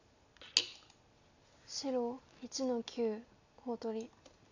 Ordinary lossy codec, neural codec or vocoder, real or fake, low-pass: none; none; real; 7.2 kHz